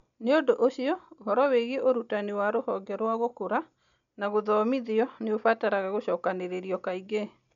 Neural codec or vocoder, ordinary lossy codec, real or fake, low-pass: none; none; real; 7.2 kHz